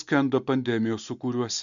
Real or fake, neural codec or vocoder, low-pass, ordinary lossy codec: real; none; 7.2 kHz; MP3, 64 kbps